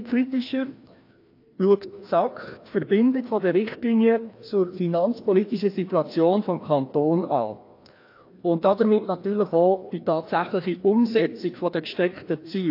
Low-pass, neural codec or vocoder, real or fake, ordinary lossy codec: 5.4 kHz; codec, 16 kHz, 1 kbps, FreqCodec, larger model; fake; AAC, 32 kbps